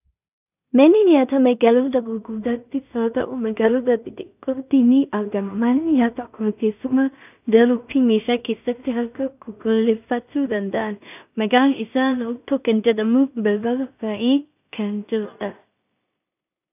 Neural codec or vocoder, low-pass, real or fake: codec, 16 kHz in and 24 kHz out, 0.4 kbps, LongCat-Audio-Codec, two codebook decoder; 3.6 kHz; fake